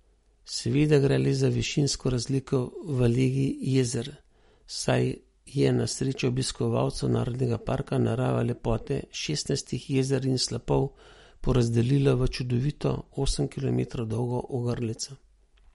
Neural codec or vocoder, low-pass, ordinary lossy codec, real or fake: none; 19.8 kHz; MP3, 48 kbps; real